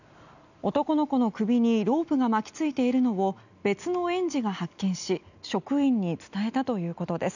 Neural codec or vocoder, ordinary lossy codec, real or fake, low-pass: none; none; real; 7.2 kHz